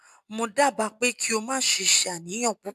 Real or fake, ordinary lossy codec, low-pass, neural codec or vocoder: real; AAC, 96 kbps; 14.4 kHz; none